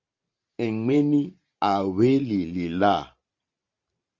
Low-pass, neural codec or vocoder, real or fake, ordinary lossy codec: 7.2 kHz; vocoder, 44.1 kHz, 128 mel bands every 512 samples, BigVGAN v2; fake; Opus, 32 kbps